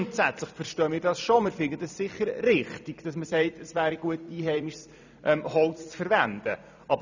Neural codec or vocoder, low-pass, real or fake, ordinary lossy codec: vocoder, 44.1 kHz, 128 mel bands every 256 samples, BigVGAN v2; 7.2 kHz; fake; none